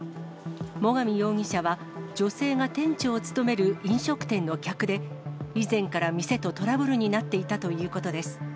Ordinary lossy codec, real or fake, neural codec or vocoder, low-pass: none; real; none; none